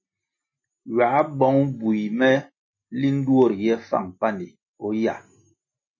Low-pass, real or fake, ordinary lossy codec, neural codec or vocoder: 7.2 kHz; real; MP3, 32 kbps; none